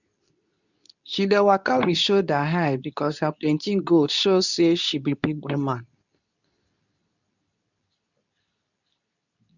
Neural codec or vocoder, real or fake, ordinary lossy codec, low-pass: codec, 24 kHz, 0.9 kbps, WavTokenizer, medium speech release version 2; fake; none; 7.2 kHz